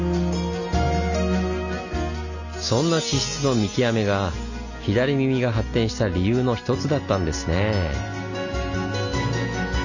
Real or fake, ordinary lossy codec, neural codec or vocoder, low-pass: real; none; none; 7.2 kHz